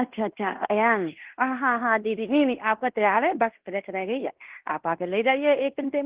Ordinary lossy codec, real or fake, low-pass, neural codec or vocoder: Opus, 16 kbps; fake; 3.6 kHz; codec, 16 kHz in and 24 kHz out, 0.9 kbps, LongCat-Audio-Codec, fine tuned four codebook decoder